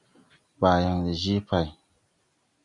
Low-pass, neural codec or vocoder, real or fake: 10.8 kHz; none; real